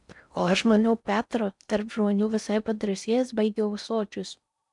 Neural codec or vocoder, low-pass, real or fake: codec, 16 kHz in and 24 kHz out, 0.6 kbps, FocalCodec, streaming, 4096 codes; 10.8 kHz; fake